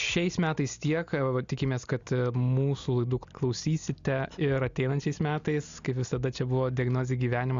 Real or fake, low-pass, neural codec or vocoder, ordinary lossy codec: real; 7.2 kHz; none; Opus, 64 kbps